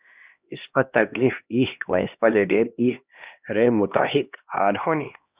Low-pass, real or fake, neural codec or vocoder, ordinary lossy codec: 3.6 kHz; fake; codec, 16 kHz, 1 kbps, X-Codec, HuBERT features, trained on LibriSpeech; Opus, 64 kbps